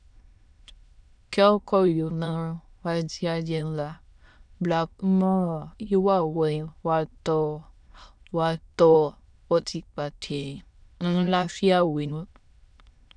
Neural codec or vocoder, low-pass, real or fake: autoencoder, 22.05 kHz, a latent of 192 numbers a frame, VITS, trained on many speakers; 9.9 kHz; fake